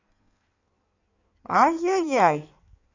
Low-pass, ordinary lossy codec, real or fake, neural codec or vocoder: 7.2 kHz; none; fake; codec, 16 kHz in and 24 kHz out, 1.1 kbps, FireRedTTS-2 codec